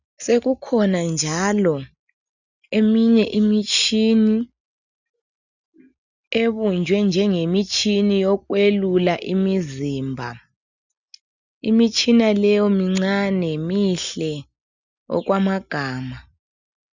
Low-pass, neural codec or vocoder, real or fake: 7.2 kHz; none; real